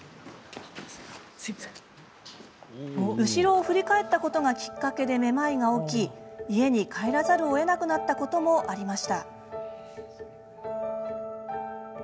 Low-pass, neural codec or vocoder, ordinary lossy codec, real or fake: none; none; none; real